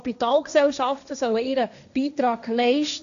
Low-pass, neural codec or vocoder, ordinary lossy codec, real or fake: 7.2 kHz; codec, 16 kHz, 1.1 kbps, Voila-Tokenizer; none; fake